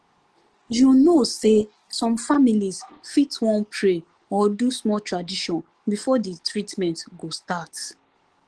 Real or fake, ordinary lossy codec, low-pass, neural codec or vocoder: fake; Opus, 16 kbps; 10.8 kHz; codec, 44.1 kHz, 7.8 kbps, DAC